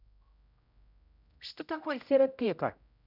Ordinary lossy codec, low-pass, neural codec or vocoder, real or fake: none; 5.4 kHz; codec, 16 kHz, 0.5 kbps, X-Codec, HuBERT features, trained on general audio; fake